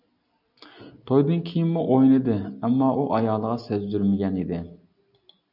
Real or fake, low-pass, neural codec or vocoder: real; 5.4 kHz; none